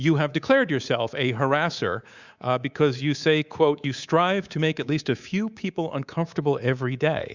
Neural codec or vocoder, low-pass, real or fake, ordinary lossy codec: codec, 24 kHz, 3.1 kbps, DualCodec; 7.2 kHz; fake; Opus, 64 kbps